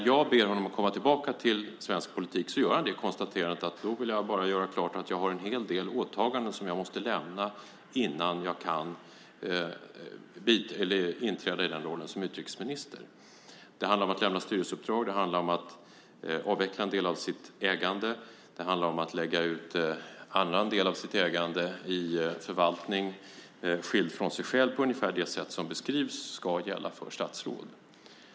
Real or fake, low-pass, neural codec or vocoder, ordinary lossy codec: real; none; none; none